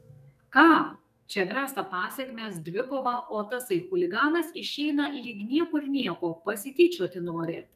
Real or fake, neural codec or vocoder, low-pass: fake; codec, 44.1 kHz, 2.6 kbps, SNAC; 14.4 kHz